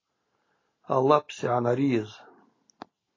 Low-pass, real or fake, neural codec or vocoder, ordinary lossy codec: 7.2 kHz; real; none; AAC, 32 kbps